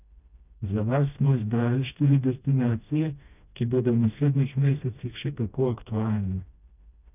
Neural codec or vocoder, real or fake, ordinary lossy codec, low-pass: codec, 16 kHz, 1 kbps, FreqCodec, smaller model; fake; none; 3.6 kHz